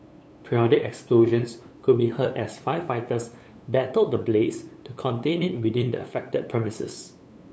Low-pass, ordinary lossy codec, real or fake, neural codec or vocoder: none; none; fake; codec, 16 kHz, 8 kbps, FunCodec, trained on LibriTTS, 25 frames a second